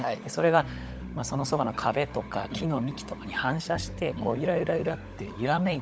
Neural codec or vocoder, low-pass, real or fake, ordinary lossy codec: codec, 16 kHz, 16 kbps, FunCodec, trained on LibriTTS, 50 frames a second; none; fake; none